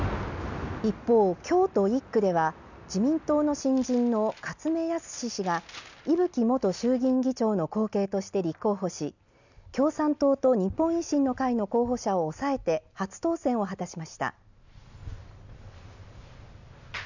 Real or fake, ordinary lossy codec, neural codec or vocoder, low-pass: real; none; none; 7.2 kHz